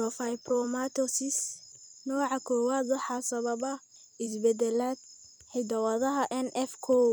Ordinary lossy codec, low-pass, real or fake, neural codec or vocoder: none; none; fake; vocoder, 44.1 kHz, 128 mel bands every 256 samples, BigVGAN v2